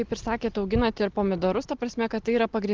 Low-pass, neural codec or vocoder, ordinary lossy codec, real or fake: 7.2 kHz; none; Opus, 16 kbps; real